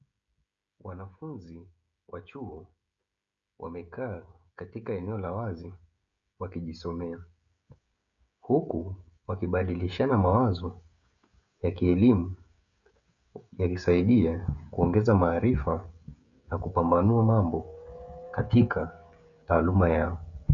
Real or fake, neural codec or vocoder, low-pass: fake; codec, 16 kHz, 16 kbps, FreqCodec, smaller model; 7.2 kHz